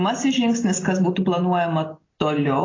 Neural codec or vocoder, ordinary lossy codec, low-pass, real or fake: none; AAC, 32 kbps; 7.2 kHz; real